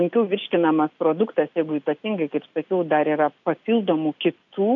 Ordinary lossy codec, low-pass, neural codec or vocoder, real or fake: MP3, 96 kbps; 7.2 kHz; none; real